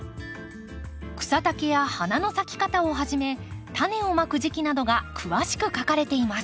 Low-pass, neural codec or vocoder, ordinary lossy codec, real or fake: none; none; none; real